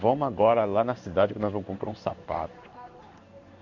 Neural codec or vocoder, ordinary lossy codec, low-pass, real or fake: codec, 16 kHz in and 24 kHz out, 1 kbps, XY-Tokenizer; none; 7.2 kHz; fake